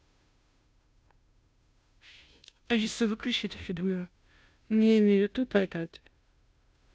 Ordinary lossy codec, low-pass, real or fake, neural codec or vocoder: none; none; fake; codec, 16 kHz, 0.5 kbps, FunCodec, trained on Chinese and English, 25 frames a second